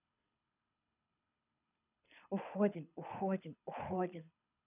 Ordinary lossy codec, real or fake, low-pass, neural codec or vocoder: none; fake; 3.6 kHz; codec, 24 kHz, 6 kbps, HILCodec